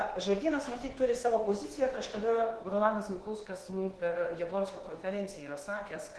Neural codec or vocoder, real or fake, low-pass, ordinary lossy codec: codec, 24 kHz, 1.2 kbps, DualCodec; fake; 10.8 kHz; Opus, 16 kbps